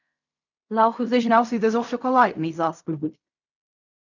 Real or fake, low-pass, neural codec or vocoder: fake; 7.2 kHz; codec, 16 kHz in and 24 kHz out, 0.4 kbps, LongCat-Audio-Codec, fine tuned four codebook decoder